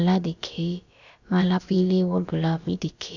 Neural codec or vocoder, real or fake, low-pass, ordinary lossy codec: codec, 16 kHz, about 1 kbps, DyCAST, with the encoder's durations; fake; 7.2 kHz; none